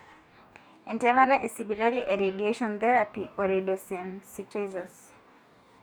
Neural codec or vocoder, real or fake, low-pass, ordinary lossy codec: codec, 44.1 kHz, 2.6 kbps, DAC; fake; none; none